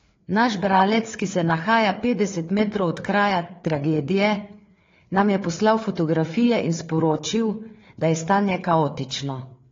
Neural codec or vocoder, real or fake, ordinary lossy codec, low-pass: codec, 16 kHz, 4 kbps, FreqCodec, larger model; fake; AAC, 32 kbps; 7.2 kHz